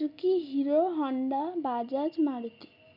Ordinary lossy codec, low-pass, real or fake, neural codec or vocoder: none; 5.4 kHz; real; none